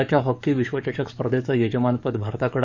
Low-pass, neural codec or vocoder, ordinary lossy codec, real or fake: 7.2 kHz; codec, 44.1 kHz, 7.8 kbps, DAC; none; fake